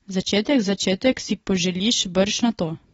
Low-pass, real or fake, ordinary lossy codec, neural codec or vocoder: 10.8 kHz; real; AAC, 24 kbps; none